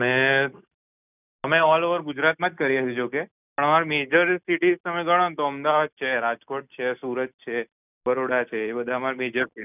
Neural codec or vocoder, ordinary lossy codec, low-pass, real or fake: none; none; 3.6 kHz; real